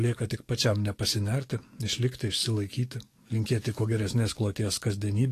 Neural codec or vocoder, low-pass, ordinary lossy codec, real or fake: codec, 44.1 kHz, 7.8 kbps, DAC; 14.4 kHz; AAC, 48 kbps; fake